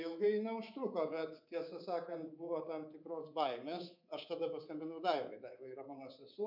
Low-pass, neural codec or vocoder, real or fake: 5.4 kHz; codec, 24 kHz, 3.1 kbps, DualCodec; fake